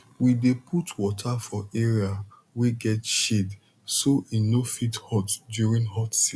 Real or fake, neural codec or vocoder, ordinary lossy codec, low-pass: real; none; none; none